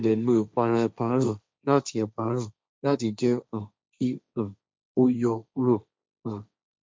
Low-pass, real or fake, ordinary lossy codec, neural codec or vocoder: none; fake; none; codec, 16 kHz, 1.1 kbps, Voila-Tokenizer